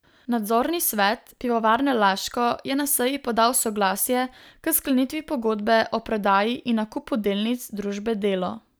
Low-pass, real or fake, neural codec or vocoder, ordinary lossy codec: none; real; none; none